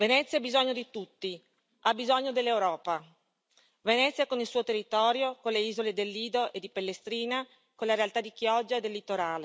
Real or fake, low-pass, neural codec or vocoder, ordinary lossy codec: real; none; none; none